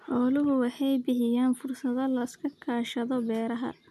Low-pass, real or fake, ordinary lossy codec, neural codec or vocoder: 14.4 kHz; real; none; none